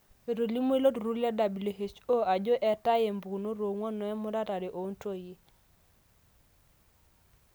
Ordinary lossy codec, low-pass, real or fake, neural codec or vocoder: none; none; real; none